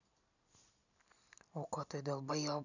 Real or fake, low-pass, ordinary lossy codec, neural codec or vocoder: real; 7.2 kHz; none; none